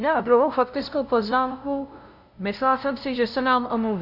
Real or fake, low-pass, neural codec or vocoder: fake; 5.4 kHz; codec, 16 kHz, 0.5 kbps, FunCodec, trained on LibriTTS, 25 frames a second